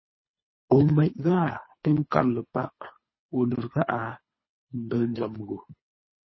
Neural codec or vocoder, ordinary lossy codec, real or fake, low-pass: codec, 24 kHz, 3 kbps, HILCodec; MP3, 24 kbps; fake; 7.2 kHz